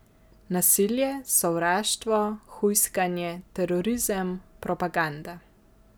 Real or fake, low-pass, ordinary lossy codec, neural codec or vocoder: real; none; none; none